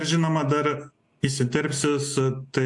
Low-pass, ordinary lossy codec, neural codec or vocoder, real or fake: 10.8 kHz; AAC, 64 kbps; none; real